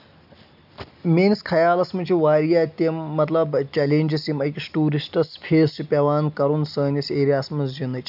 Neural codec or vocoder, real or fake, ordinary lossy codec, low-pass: none; real; none; 5.4 kHz